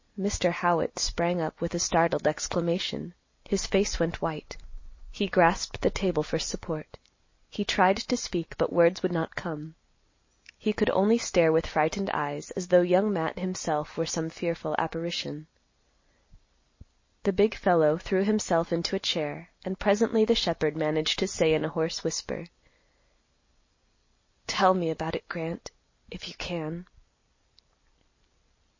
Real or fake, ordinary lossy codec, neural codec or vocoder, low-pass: real; MP3, 32 kbps; none; 7.2 kHz